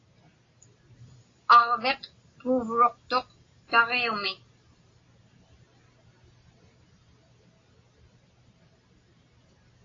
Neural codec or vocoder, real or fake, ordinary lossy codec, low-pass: none; real; AAC, 32 kbps; 7.2 kHz